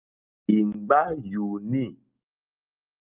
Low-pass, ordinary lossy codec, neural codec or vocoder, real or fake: 3.6 kHz; Opus, 24 kbps; none; real